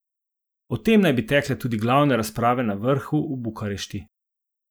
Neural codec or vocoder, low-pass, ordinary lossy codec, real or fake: none; none; none; real